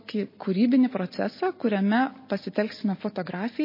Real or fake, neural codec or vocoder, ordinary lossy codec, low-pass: real; none; MP3, 24 kbps; 5.4 kHz